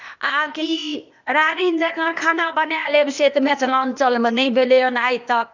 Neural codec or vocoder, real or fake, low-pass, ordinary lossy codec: codec, 16 kHz, 0.8 kbps, ZipCodec; fake; 7.2 kHz; none